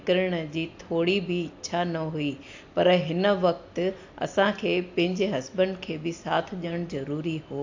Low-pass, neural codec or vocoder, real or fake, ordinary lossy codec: 7.2 kHz; none; real; AAC, 48 kbps